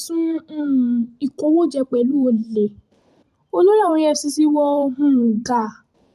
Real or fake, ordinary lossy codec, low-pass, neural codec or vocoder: fake; none; 14.4 kHz; vocoder, 44.1 kHz, 128 mel bands every 512 samples, BigVGAN v2